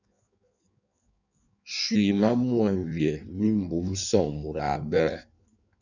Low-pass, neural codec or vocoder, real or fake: 7.2 kHz; codec, 16 kHz in and 24 kHz out, 1.1 kbps, FireRedTTS-2 codec; fake